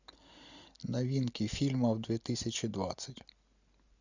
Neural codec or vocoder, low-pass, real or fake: none; 7.2 kHz; real